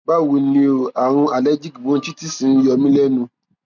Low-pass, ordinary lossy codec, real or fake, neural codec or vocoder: 7.2 kHz; none; real; none